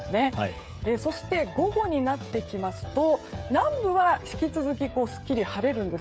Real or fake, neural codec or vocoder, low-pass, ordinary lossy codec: fake; codec, 16 kHz, 16 kbps, FreqCodec, smaller model; none; none